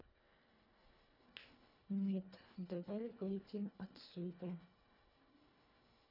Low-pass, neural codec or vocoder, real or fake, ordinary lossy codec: 5.4 kHz; codec, 24 kHz, 1.5 kbps, HILCodec; fake; none